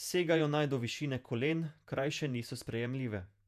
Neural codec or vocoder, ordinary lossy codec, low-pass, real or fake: vocoder, 48 kHz, 128 mel bands, Vocos; none; 14.4 kHz; fake